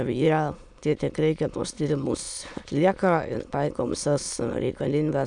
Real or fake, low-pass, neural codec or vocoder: fake; 9.9 kHz; autoencoder, 22.05 kHz, a latent of 192 numbers a frame, VITS, trained on many speakers